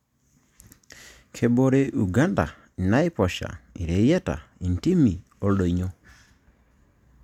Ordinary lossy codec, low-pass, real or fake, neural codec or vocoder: none; 19.8 kHz; real; none